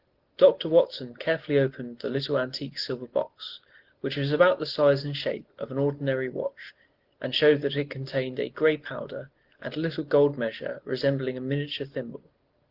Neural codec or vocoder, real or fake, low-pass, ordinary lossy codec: none; real; 5.4 kHz; Opus, 16 kbps